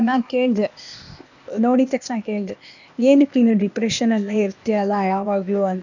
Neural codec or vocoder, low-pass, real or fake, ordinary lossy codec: codec, 16 kHz, 0.8 kbps, ZipCodec; 7.2 kHz; fake; none